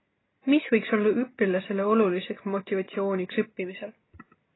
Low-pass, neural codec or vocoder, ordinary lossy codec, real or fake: 7.2 kHz; none; AAC, 16 kbps; real